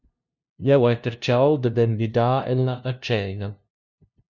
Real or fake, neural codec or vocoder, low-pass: fake; codec, 16 kHz, 0.5 kbps, FunCodec, trained on LibriTTS, 25 frames a second; 7.2 kHz